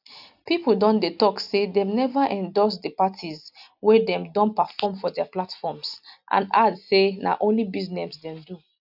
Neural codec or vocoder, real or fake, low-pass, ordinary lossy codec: none; real; 5.4 kHz; none